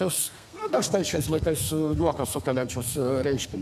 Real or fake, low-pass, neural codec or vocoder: fake; 14.4 kHz; codec, 32 kHz, 1.9 kbps, SNAC